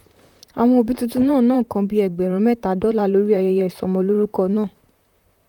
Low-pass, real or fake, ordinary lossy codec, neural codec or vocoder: 19.8 kHz; fake; none; vocoder, 44.1 kHz, 128 mel bands, Pupu-Vocoder